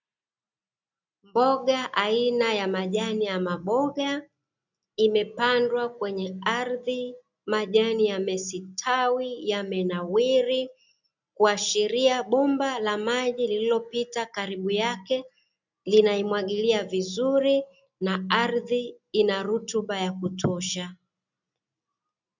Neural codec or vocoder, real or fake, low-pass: none; real; 7.2 kHz